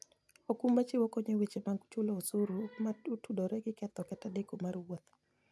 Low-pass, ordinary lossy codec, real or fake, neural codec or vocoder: none; none; real; none